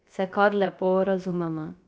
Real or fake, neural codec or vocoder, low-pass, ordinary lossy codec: fake; codec, 16 kHz, about 1 kbps, DyCAST, with the encoder's durations; none; none